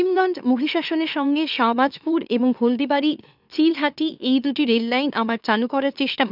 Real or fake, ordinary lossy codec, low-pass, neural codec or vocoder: fake; none; 5.4 kHz; autoencoder, 44.1 kHz, a latent of 192 numbers a frame, MeloTTS